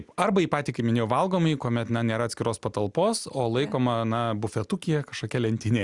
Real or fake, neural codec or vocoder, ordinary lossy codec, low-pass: real; none; Opus, 64 kbps; 10.8 kHz